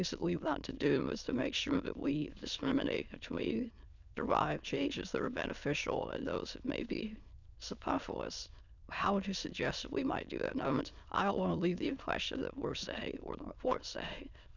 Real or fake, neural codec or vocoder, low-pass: fake; autoencoder, 22.05 kHz, a latent of 192 numbers a frame, VITS, trained on many speakers; 7.2 kHz